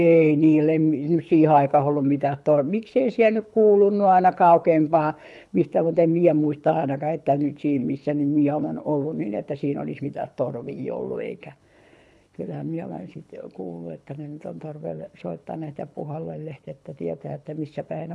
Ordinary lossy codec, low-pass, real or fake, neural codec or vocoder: none; none; fake; codec, 24 kHz, 6 kbps, HILCodec